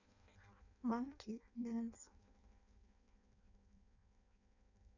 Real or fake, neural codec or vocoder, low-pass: fake; codec, 16 kHz in and 24 kHz out, 0.6 kbps, FireRedTTS-2 codec; 7.2 kHz